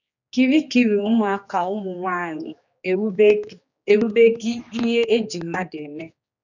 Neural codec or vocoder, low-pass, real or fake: codec, 16 kHz, 2 kbps, X-Codec, HuBERT features, trained on general audio; 7.2 kHz; fake